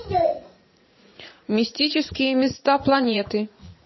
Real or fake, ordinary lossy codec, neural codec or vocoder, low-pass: fake; MP3, 24 kbps; vocoder, 44.1 kHz, 80 mel bands, Vocos; 7.2 kHz